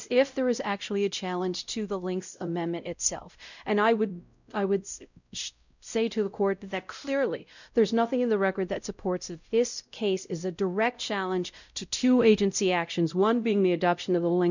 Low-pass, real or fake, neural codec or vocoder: 7.2 kHz; fake; codec, 16 kHz, 0.5 kbps, X-Codec, WavLM features, trained on Multilingual LibriSpeech